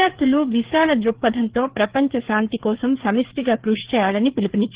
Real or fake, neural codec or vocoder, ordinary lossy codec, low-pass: fake; codec, 16 kHz, 4 kbps, FreqCodec, larger model; Opus, 16 kbps; 3.6 kHz